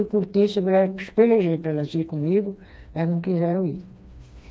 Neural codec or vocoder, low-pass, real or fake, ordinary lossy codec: codec, 16 kHz, 2 kbps, FreqCodec, smaller model; none; fake; none